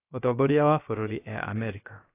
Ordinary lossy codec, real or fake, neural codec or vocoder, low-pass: AAC, 24 kbps; fake; codec, 16 kHz, about 1 kbps, DyCAST, with the encoder's durations; 3.6 kHz